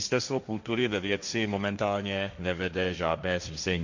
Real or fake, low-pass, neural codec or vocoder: fake; 7.2 kHz; codec, 16 kHz, 1.1 kbps, Voila-Tokenizer